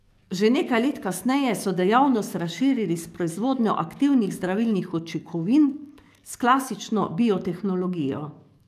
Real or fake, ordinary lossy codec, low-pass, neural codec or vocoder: fake; none; 14.4 kHz; codec, 44.1 kHz, 7.8 kbps, DAC